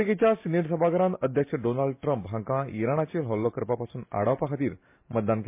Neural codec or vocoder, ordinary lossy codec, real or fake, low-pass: none; MP3, 24 kbps; real; 3.6 kHz